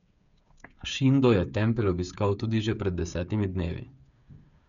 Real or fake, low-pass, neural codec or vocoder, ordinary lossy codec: fake; 7.2 kHz; codec, 16 kHz, 8 kbps, FreqCodec, smaller model; none